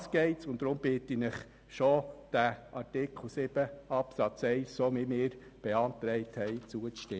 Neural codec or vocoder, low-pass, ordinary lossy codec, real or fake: none; none; none; real